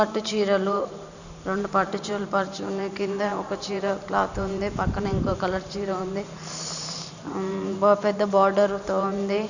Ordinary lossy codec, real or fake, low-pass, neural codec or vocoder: none; fake; 7.2 kHz; vocoder, 44.1 kHz, 128 mel bands every 512 samples, BigVGAN v2